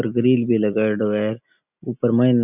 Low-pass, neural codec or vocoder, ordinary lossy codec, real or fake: 3.6 kHz; none; none; real